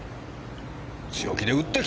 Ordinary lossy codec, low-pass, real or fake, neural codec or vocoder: none; none; real; none